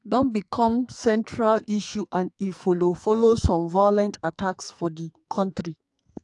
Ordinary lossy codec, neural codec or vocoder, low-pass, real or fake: AAC, 64 kbps; codec, 44.1 kHz, 2.6 kbps, SNAC; 10.8 kHz; fake